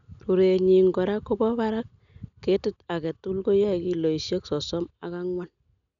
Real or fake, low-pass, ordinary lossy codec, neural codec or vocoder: real; 7.2 kHz; none; none